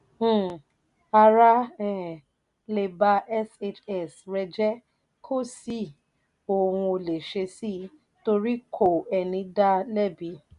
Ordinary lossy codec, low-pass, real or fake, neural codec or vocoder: none; 10.8 kHz; real; none